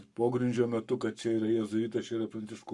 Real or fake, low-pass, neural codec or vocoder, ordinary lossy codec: fake; 10.8 kHz; codec, 44.1 kHz, 7.8 kbps, Pupu-Codec; Opus, 64 kbps